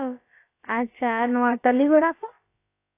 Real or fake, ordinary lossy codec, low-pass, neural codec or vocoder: fake; AAC, 24 kbps; 3.6 kHz; codec, 16 kHz, about 1 kbps, DyCAST, with the encoder's durations